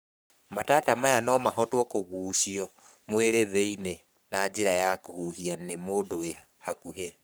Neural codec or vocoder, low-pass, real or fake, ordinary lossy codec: codec, 44.1 kHz, 3.4 kbps, Pupu-Codec; none; fake; none